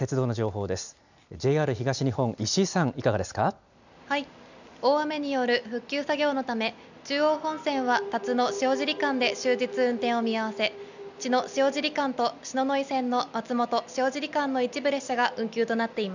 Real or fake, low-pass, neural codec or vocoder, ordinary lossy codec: real; 7.2 kHz; none; none